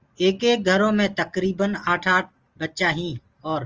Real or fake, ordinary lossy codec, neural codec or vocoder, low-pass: real; Opus, 24 kbps; none; 7.2 kHz